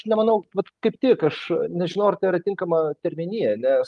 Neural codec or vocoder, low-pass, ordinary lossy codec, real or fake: none; 10.8 kHz; Opus, 32 kbps; real